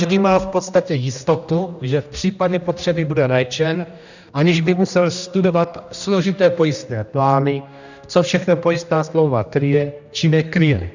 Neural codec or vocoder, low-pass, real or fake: codec, 16 kHz, 1 kbps, X-Codec, HuBERT features, trained on general audio; 7.2 kHz; fake